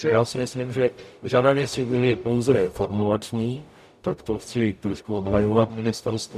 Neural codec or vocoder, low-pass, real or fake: codec, 44.1 kHz, 0.9 kbps, DAC; 14.4 kHz; fake